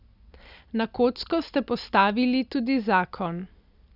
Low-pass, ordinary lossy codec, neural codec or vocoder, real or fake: 5.4 kHz; none; none; real